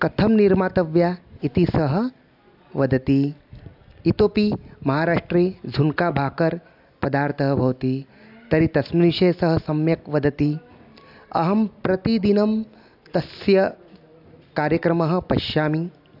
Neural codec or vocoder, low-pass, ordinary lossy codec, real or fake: none; 5.4 kHz; AAC, 48 kbps; real